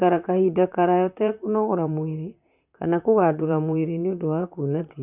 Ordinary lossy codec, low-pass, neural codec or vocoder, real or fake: none; 3.6 kHz; vocoder, 44.1 kHz, 128 mel bands, Pupu-Vocoder; fake